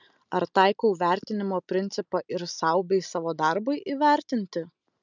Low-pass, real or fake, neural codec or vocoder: 7.2 kHz; real; none